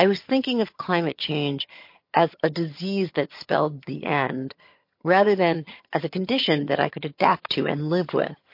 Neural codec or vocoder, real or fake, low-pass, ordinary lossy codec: vocoder, 22.05 kHz, 80 mel bands, HiFi-GAN; fake; 5.4 kHz; MP3, 32 kbps